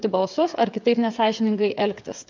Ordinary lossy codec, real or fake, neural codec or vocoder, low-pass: MP3, 64 kbps; fake; codec, 16 kHz, 8 kbps, FreqCodec, smaller model; 7.2 kHz